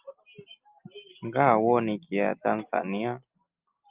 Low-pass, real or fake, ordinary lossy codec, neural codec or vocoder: 3.6 kHz; real; Opus, 32 kbps; none